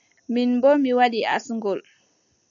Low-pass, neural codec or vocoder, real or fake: 7.2 kHz; none; real